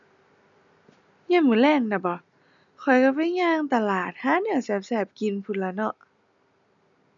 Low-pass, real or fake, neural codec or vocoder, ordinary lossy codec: 7.2 kHz; real; none; none